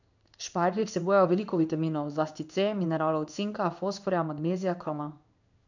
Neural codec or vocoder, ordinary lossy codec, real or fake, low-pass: codec, 16 kHz in and 24 kHz out, 1 kbps, XY-Tokenizer; none; fake; 7.2 kHz